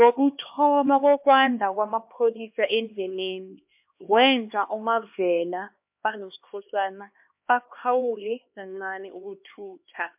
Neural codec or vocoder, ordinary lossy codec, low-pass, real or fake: codec, 16 kHz, 2 kbps, X-Codec, HuBERT features, trained on LibriSpeech; MP3, 32 kbps; 3.6 kHz; fake